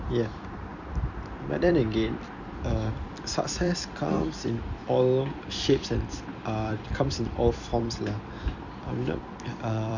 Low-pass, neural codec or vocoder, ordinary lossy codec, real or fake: 7.2 kHz; vocoder, 44.1 kHz, 128 mel bands every 256 samples, BigVGAN v2; none; fake